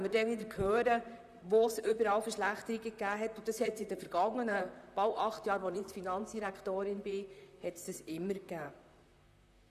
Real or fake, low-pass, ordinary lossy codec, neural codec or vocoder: fake; 14.4 kHz; none; vocoder, 44.1 kHz, 128 mel bands, Pupu-Vocoder